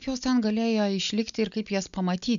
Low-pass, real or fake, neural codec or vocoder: 7.2 kHz; real; none